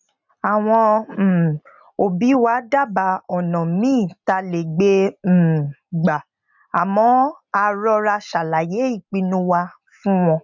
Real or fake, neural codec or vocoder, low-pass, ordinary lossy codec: real; none; 7.2 kHz; none